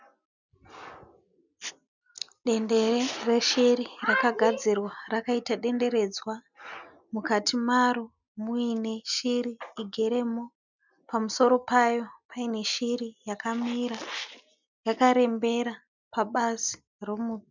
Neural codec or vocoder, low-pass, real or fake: none; 7.2 kHz; real